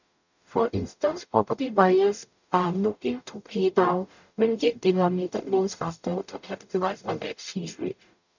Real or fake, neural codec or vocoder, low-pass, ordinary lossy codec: fake; codec, 44.1 kHz, 0.9 kbps, DAC; 7.2 kHz; none